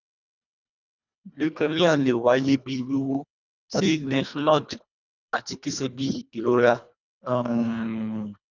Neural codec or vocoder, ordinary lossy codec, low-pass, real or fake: codec, 24 kHz, 1.5 kbps, HILCodec; none; 7.2 kHz; fake